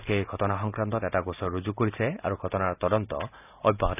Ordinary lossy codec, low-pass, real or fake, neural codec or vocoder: none; 3.6 kHz; real; none